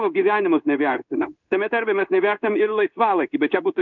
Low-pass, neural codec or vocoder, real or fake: 7.2 kHz; codec, 16 kHz in and 24 kHz out, 1 kbps, XY-Tokenizer; fake